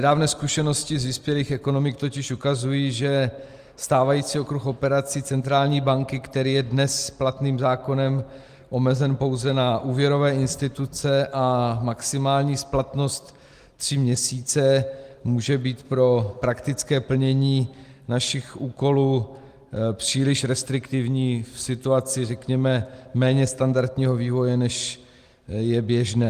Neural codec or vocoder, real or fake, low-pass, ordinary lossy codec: none; real; 14.4 kHz; Opus, 24 kbps